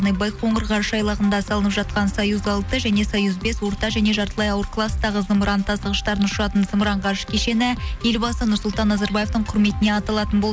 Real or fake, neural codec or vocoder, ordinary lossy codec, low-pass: real; none; none; none